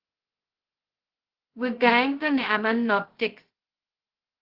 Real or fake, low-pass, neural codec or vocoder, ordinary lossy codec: fake; 5.4 kHz; codec, 16 kHz, 0.2 kbps, FocalCodec; Opus, 16 kbps